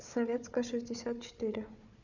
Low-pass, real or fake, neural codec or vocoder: 7.2 kHz; fake; codec, 16 kHz, 4 kbps, FunCodec, trained on Chinese and English, 50 frames a second